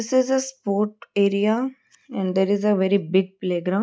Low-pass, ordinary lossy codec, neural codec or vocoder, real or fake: none; none; none; real